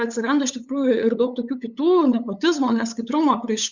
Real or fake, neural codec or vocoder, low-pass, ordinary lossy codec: fake; codec, 16 kHz, 8 kbps, FunCodec, trained on LibriTTS, 25 frames a second; 7.2 kHz; Opus, 64 kbps